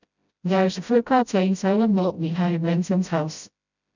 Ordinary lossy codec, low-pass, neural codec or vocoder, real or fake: none; 7.2 kHz; codec, 16 kHz, 0.5 kbps, FreqCodec, smaller model; fake